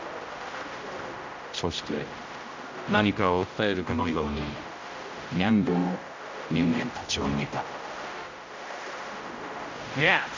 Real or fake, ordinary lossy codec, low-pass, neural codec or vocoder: fake; MP3, 64 kbps; 7.2 kHz; codec, 16 kHz, 0.5 kbps, X-Codec, HuBERT features, trained on general audio